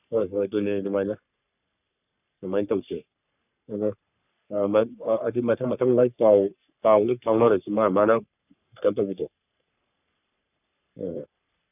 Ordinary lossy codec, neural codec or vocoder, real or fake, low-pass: none; codec, 44.1 kHz, 3.4 kbps, Pupu-Codec; fake; 3.6 kHz